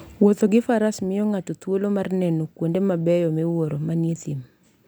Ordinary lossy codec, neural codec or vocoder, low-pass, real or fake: none; none; none; real